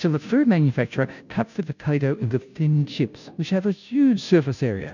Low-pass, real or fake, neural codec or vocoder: 7.2 kHz; fake; codec, 16 kHz, 0.5 kbps, FunCodec, trained on Chinese and English, 25 frames a second